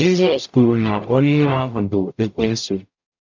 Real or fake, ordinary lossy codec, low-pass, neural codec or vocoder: fake; MP3, 64 kbps; 7.2 kHz; codec, 44.1 kHz, 0.9 kbps, DAC